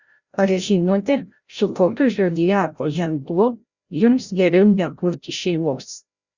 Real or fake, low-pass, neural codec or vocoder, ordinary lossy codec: fake; 7.2 kHz; codec, 16 kHz, 0.5 kbps, FreqCodec, larger model; Opus, 64 kbps